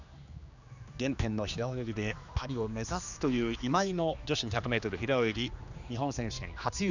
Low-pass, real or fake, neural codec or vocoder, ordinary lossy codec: 7.2 kHz; fake; codec, 16 kHz, 2 kbps, X-Codec, HuBERT features, trained on general audio; none